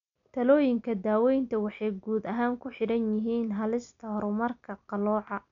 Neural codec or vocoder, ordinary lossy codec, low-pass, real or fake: none; none; 7.2 kHz; real